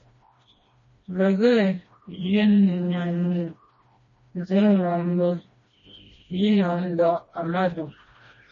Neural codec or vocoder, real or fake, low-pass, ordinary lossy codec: codec, 16 kHz, 1 kbps, FreqCodec, smaller model; fake; 7.2 kHz; MP3, 32 kbps